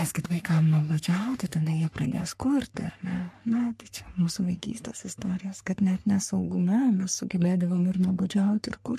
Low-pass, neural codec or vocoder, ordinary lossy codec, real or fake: 14.4 kHz; codec, 44.1 kHz, 3.4 kbps, Pupu-Codec; MP3, 64 kbps; fake